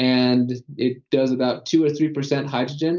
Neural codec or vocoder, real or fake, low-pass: none; real; 7.2 kHz